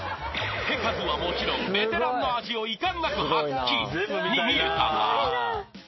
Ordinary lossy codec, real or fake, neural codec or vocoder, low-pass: MP3, 24 kbps; real; none; 7.2 kHz